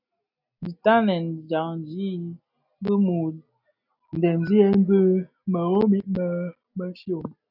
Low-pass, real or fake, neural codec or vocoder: 5.4 kHz; real; none